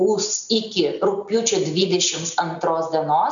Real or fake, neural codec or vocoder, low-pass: real; none; 7.2 kHz